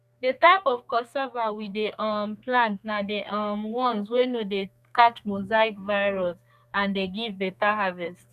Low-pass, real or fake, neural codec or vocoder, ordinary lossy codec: 14.4 kHz; fake; codec, 32 kHz, 1.9 kbps, SNAC; none